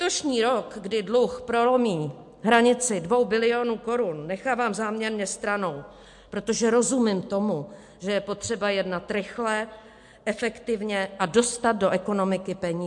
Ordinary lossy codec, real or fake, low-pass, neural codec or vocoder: MP3, 64 kbps; real; 10.8 kHz; none